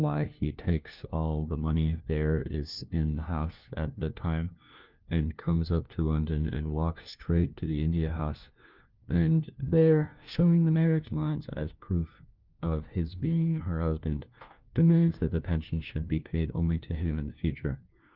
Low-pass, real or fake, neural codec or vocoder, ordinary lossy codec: 5.4 kHz; fake; codec, 16 kHz, 1 kbps, FunCodec, trained on LibriTTS, 50 frames a second; Opus, 24 kbps